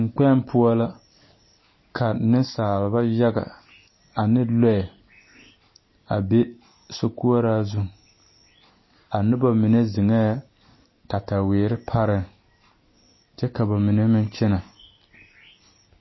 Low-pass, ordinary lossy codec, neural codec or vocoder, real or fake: 7.2 kHz; MP3, 24 kbps; none; real